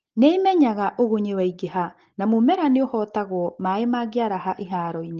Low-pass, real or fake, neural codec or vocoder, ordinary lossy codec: 7.2 kHz; real; none; Opus, 16 kbps